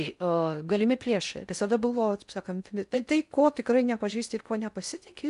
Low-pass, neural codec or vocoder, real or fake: 10.8 kHz; codec, 16 kHz in and 24 kHz out, 0.6 kbps, FocalCodec, streaming, 4096 codes; fake